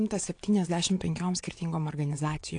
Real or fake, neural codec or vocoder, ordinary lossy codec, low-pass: fake; vocoder, 22.05 kHz, 80 mel bands, WaveNeXt; AAC, 48 kbps; 9.9 kHz